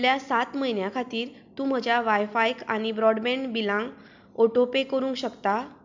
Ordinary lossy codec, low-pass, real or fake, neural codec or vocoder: MP3, 64 kbps; 7.2 kHz; real; none